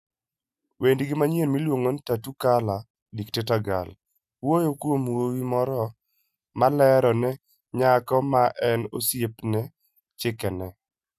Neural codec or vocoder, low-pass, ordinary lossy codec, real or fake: none; 14.4 kHz; none; real